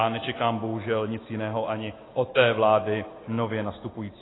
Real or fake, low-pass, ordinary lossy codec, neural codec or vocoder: real; 7.2 kHz; AAC, 16 kbps; none